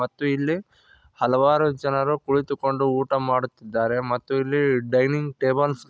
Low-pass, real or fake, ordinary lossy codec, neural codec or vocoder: none; real; none; none